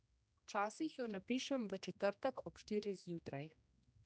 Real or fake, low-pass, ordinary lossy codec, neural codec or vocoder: fake; none; none; codec, 16 kHz, 1 kbps, X-Codec, HuBERT features, trained on general audio